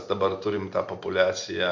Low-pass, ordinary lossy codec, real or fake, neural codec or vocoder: 7.2 kHz; MP3, 48 kbps; real; none